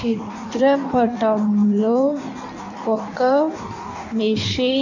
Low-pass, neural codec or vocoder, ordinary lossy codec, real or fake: 7.2 kHz; codec, 16 kHz in and 24 kHz out, 1.1 kbps, FireRedTTS-2 codec; none; fake